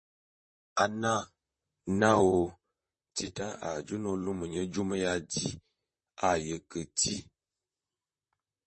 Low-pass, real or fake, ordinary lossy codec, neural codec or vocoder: 10.8 kHz; fake; MP3, 32 kbps; vocoder, 44.1 kHz, 128 mel bands, Pupu-Vocoder